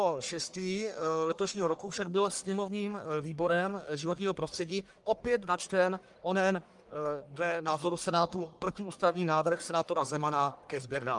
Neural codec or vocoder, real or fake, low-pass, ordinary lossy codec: codec, 44.1 kHz, 1.7 kbps, Pupu-Codec; fake; 10.8 kHz; Opus, 32 kbps